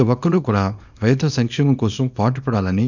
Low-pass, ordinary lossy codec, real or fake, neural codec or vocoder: 7.2 kHz; none; fake; codec, 24 kHz, 0.9 kbps, WavTokenizer, small release